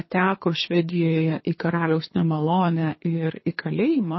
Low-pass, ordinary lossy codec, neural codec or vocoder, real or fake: 7.2 kHz; MP3, 24 kbps; codec, 24 kHz, 3 kbps, HILCodec; fake